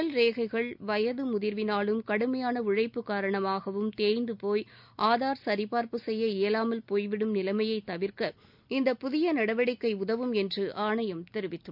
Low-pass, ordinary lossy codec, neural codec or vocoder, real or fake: 5.4 kHz; none; none; real